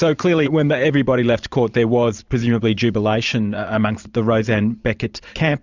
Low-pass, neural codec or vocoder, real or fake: 7.2 kHz; vocoder, 44.1 kHz, 128 mel bands every 512 samples, BigVGAN v2; fake